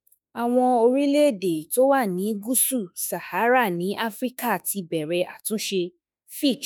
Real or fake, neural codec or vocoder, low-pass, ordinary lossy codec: fake; autoencoder, 48 kHz, 32 numbers a frame, DAC-VAE, trained on Japanese speech; none; none